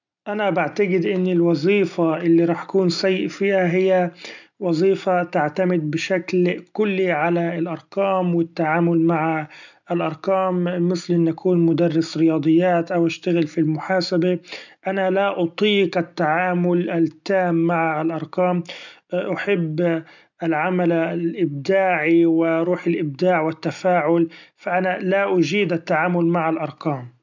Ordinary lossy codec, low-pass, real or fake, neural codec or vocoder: none; 7.2 kHz; real; none